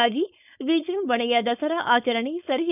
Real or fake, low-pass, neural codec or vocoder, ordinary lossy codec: fake; 3.6 kHz; codec, 16 kHz, 4.8 kbps, FACodec; none